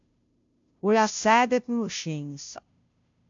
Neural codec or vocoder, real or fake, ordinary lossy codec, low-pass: codec, 16 kHz, 0.5 kbps, FunCodec, trained on Chinese and English, 25 frames a second; fake; AAC, 64 kbps; 7.2 kHz